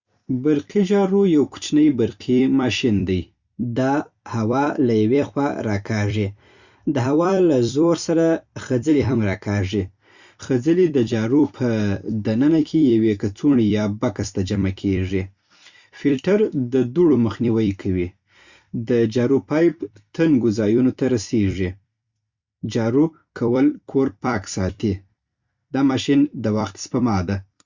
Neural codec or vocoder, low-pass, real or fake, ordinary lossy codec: vocoder, 44.1 kHz, 128 mel bands every 256 samples, BigVGAN v2; 7.2 kHz; fake; Opus, 64 kbps